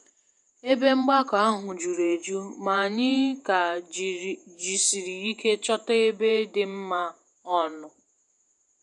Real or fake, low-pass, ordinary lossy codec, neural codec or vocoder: fake; 10.8 kHz; none; vocoder, 48 kHz, 128 mel bands, Vocos